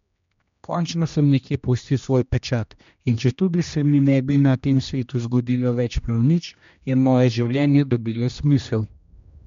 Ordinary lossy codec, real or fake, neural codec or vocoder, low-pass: MP3, 64 kbps; fake; codec, 16 kHz, 1 kbps, X-Codec, HuBERT features, trained on general audio; 7.2 kHz